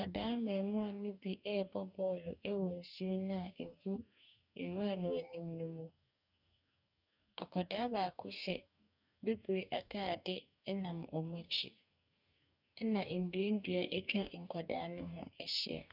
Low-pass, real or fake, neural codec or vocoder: 5.4 kHz; fake; codec, 44.1 kHz, 2.6 kbps, DAC